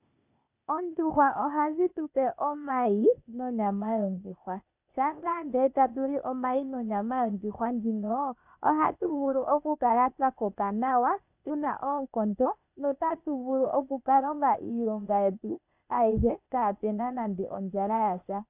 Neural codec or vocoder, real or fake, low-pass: codec, 16 kHz, 0.8 kbps, ZipCodec; fake; 3.6 kHz